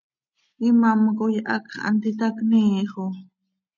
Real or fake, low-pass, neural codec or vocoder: real; 7.2 kHz; none